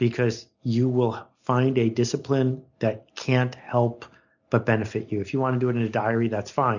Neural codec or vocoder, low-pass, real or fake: none; 7.2 kHz; real